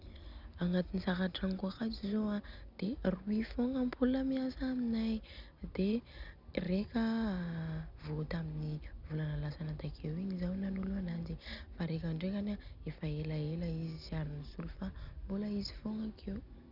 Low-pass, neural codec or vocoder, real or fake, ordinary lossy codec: 5.4 kHz; none; real; none